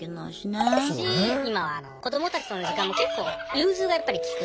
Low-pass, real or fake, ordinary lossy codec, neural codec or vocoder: none; real; none; none